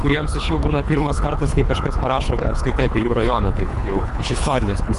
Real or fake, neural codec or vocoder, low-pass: fake; codec, 24 kHz, 3 kbps, HILCodec; 10.8 kHz